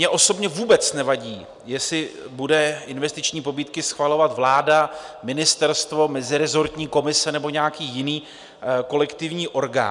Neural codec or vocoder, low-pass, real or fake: none; 10.8 kHz; real